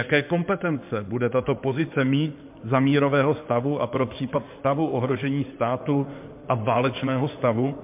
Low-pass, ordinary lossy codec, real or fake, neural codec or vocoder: 3.6 kHz; MP3, 32 kbps; fake; codec, 16 kHz in and 24 kHz out, 2.2 kbps, FireRedTTS-2 codec